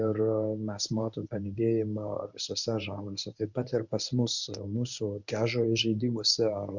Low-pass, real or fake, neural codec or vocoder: 7.2 kHz; fake; codec, 24 kHz, 0.9 kbps, WavTokenizer, medium speech release version 1